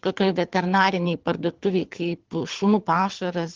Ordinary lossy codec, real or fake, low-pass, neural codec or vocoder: Opus, 16 kbps; fake; 7.2 kHz; codec, 24 kHz, 6 kbps, HILCodec